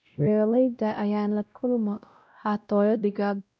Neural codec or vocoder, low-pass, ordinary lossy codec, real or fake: codec, 16 kHz, 0.5 kbps, X-Codec, WavLM features, trained on Multilingual LibriSpeech; none; none; fake